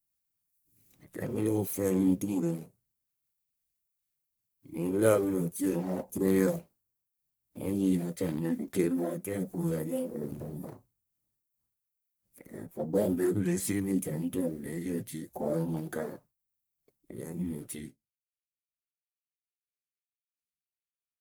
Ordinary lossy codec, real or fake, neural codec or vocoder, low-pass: none; fake; codec, 44.1 kHz, 1.7 kbps, Pupu-Codec; none